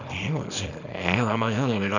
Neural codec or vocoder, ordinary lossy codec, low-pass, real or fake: codec, 24 kHz, 0.9 kbps, WavTokenizer, small release; none; 7.2 kHz; fake